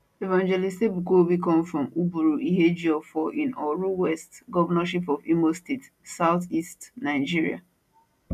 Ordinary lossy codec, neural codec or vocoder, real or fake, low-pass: none; none; real; 14.4 kHz